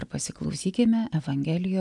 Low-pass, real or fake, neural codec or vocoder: 10.8 kHz; real; none